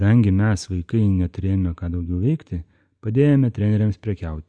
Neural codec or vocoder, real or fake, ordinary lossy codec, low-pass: none; real; AAC, 64 kbps; 9.9 kHz